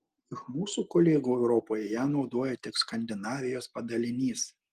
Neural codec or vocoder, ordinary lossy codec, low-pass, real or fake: none; Opus, 24 kbps; 14.4 kHz; real